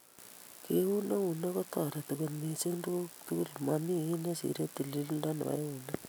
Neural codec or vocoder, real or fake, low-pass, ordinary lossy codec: none; real; none; none